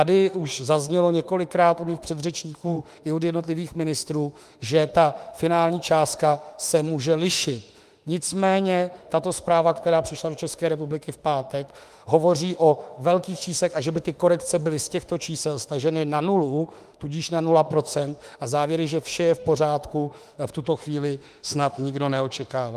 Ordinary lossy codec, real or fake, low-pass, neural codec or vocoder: Opus, 32 kbps; fake; 14.4 kHz; autoencoder, 48 kHz, 32 numbers a frame, DAC-VAE, trained on Japanese speech